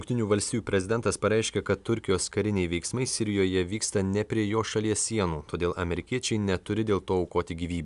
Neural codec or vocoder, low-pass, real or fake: none; 10.8 kHz; real